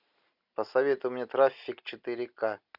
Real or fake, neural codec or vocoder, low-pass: real; none; 5.4 kHz